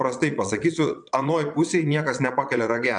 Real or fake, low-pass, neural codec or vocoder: fake; 9.9 kHz; vocoder, 22.05 kHz, 80 mel bands, Vocos